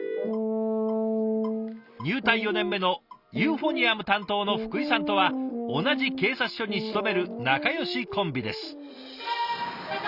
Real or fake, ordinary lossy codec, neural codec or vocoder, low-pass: real; Opus, 64 kbps; none; 5.4 kHz